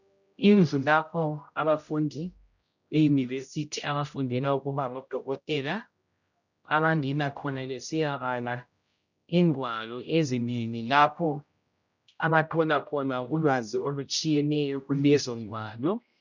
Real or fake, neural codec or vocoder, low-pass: fake; codec, 16 kHz, 0.5 kbps, X-Codec, HuBERT features, trained on general audio; 7.2 kHz